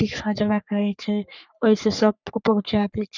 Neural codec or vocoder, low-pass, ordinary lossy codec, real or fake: codec, 44.1 kHz, 3.4 kbps, Pupu-Codec; 7.2 kHz; none; fake